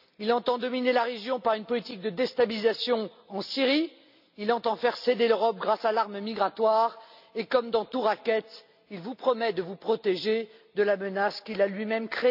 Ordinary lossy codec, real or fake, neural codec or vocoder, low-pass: AAC, 48 kbps; real; none; 5.4 kHz